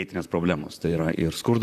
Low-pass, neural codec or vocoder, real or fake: 14.4 kHz; vocoder, 44.1 kHz, 128 mel bands, Pupu-Vocoder; fake